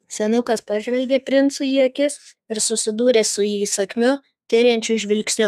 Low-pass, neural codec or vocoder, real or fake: 14.4 kHz; codec, 32 kHz, 1.9 kbps, SNAC; fake